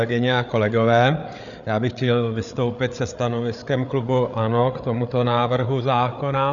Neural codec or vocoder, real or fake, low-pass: codec, 16 kHz, 8 kbps, FreqCodec, larger model; fake; 7.2 kHz